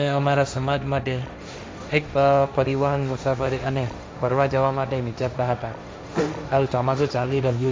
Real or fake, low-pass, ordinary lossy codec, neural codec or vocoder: fake; none; none; codec, 16 kHz, 1.1 kbps, Voila-Tokenizer